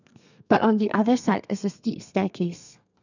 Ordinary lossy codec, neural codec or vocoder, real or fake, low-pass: none; codec, 32 kHz, 1.9 kbps, SNAC; fake; 7.2 kHz